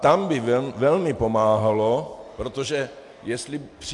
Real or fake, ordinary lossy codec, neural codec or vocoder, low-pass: real; AAC, 64 kbps; none; 10.8 kHz